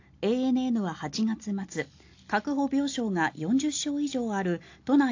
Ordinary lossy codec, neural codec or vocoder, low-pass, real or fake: AAC, 48 kbps; none; 7.2 kHz; real